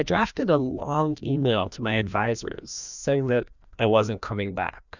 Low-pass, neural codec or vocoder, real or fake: 7.2 kHz; codec, 16 kHz, 1 kbps, FreqCodec, larger model; fake